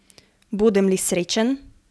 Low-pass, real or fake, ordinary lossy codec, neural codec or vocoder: none; real; none; none